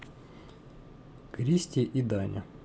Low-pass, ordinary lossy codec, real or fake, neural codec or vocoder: none; none; real; none